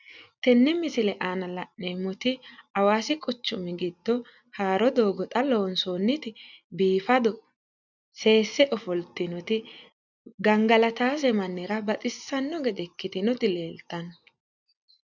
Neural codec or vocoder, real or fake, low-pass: none; real; 7.2 kHz